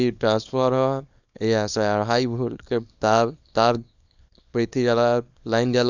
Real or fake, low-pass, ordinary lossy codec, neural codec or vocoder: fake; 7.2 kHz; none; codec, 24 kHz, 0.9 kbps, WavTokenizer, small release